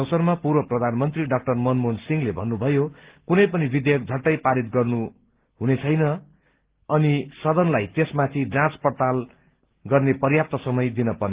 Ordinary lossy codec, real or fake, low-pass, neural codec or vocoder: Opus, 16 kbps; real; 3.6 kHz; none